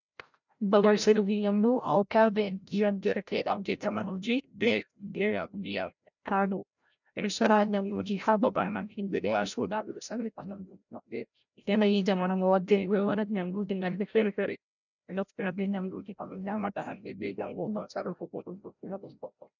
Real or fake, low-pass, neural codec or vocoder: fake; 7.2 kHz; codec, 16 kHz, 0.5 kbps, FreqCodec, larger model